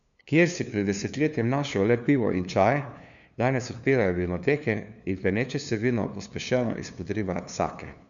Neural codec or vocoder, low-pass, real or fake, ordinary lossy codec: codec, 16 kHz, 2 kbps, FunCodec, trained on LibriTTS, 25 frames a second; 7.2 kHz; fake; none